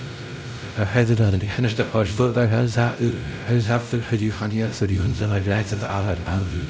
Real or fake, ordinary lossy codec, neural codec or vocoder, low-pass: fake; none; codec, 16 kHz, 0.5 kbps, X-Codec, WavLM features, trained on Multilingual LibriSpeech; none